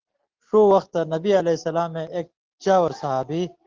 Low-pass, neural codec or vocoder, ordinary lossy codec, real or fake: 7.2 kHz; none; Opus, 16 kbps; real